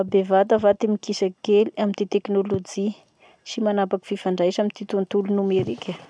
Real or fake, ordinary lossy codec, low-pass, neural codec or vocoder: real; none; 9.9 kHz; none